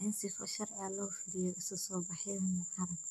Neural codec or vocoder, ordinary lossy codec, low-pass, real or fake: codec, 44.1 kHz, 7.8 kbps, DAC; none; 14.4 kHz; fake